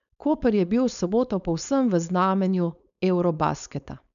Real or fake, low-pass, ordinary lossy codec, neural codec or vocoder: fake; 7.2 kHz; none; codec, 16 kHz, 4.8 kbps, FACodec